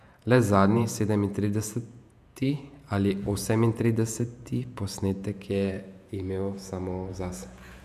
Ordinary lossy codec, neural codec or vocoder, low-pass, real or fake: MP3, 96 kbps; vocoder, 48 kHz, 128 mel bands, Vocos; 14.4 kHz; fake